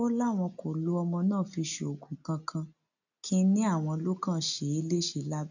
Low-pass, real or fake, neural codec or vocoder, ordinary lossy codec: 7.2 kHz; real; none; none